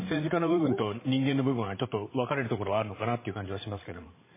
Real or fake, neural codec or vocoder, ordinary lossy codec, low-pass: fake; codec, 16 kHz, 8 kbps, FreqCodec, larger model; MP3, 16 kbps; 3.6 kHz